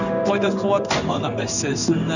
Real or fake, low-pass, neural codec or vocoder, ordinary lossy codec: fake; 7.2 kHz; codec, 16 kHz in and 24 kHz out, 1 kbps, XY-Tokenizer; none